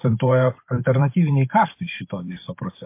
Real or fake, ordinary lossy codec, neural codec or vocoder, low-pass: fake; MP3, 24 kbps; vocoder, 44.1 kHz, 128 mel bands every 512 samples, BigVGAN v2; 3.6 kHz